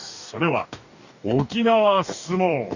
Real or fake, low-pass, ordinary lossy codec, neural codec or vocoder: fake; 7.2 kHz; none; codec, 44.1 kHz, 2.6 kbps, DAC